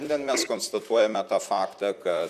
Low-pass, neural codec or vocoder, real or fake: 14.4 kHz; vocoder, 44.1 kHz, 128 mel bands, Pupu-Vocoder; fake